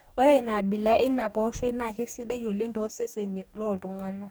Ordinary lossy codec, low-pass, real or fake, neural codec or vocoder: none; none; fake; codec, 44.1 kHz, 2.6 kbps, DAC